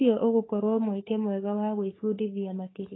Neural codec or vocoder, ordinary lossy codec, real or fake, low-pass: codec, 44.1 kHz, 3.4 kbps, Pupu-Codec; AAC, 16 kbps; fake; 7.2 kHz